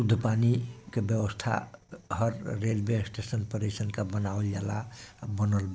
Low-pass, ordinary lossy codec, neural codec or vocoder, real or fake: none; none; none; real